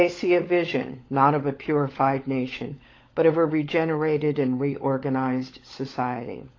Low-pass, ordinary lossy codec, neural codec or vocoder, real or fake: 7.2 kHz; AAC, 32 kbps; codec, 16 kHz, 16 kbps, FunCodec, trained on LibriTTS, 50 frames a second; fake